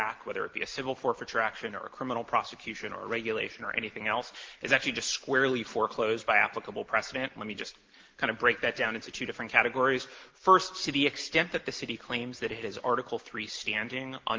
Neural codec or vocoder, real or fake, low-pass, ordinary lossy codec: none; real; 7.2 kHz; Opus, 16 kbps